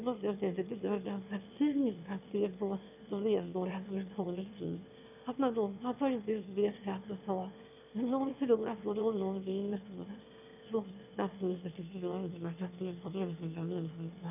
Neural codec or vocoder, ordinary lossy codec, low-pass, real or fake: autoencoder, 22.05 kHz, a latent of 192 numbers a frame, VITS, trained on one speaker; none; 3.6 kHz; fake